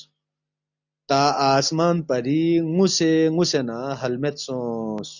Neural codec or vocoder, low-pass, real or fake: none; 7.2 kHz; real